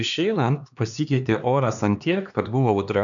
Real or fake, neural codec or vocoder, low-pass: fake; codec, 16 kHz, 2 kbps, X-Codec, HuBERT features, trained on LibriSpeech; 7.2 kHz